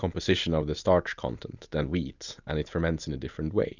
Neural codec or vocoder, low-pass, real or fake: none; 7.2 kHz; real